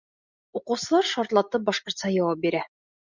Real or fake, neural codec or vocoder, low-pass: real; none; 7.2 kHz